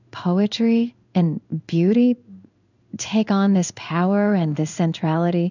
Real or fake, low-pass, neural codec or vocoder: fake; 7.2 kHz; codec, 16 kHz in and 24 kHz out, 1 kbps, XY-Tokenizer